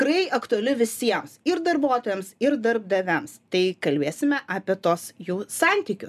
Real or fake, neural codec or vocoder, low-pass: real; none; 14.4 kHz